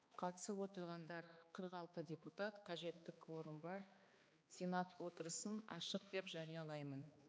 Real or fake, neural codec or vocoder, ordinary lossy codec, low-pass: fake; codec, 16 kHz, 2 kbps, X-Codec, HuBERT features, trained on balanced general audio; none; none